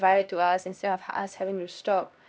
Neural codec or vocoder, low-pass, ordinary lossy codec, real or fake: codec, 16 kHz, 1 kbps, X-Codec, HuBERT features, trained on LibriSpeech; none; none; fake